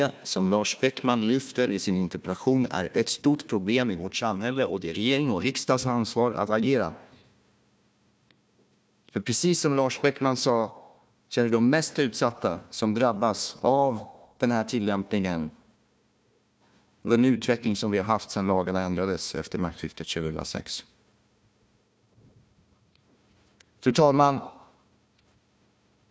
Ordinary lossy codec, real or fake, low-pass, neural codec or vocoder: none; fake; none; codec, 16 kHz, 1 kbps, FunCodec, trained on Chinese and English, 50 frames a second